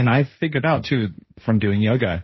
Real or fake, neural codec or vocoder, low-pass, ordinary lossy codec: fake; codec, 16 kHz, 1.1 kbps, Voila-Tokenizer; 7.2 kHz; MP3, 24 kbps